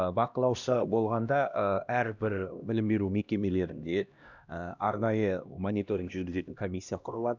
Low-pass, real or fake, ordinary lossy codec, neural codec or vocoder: 7.2 kHz; fake; none; codec, 16 kHz, 1 kbps, X-Codec, HuBERT features, trained on LibriSpeech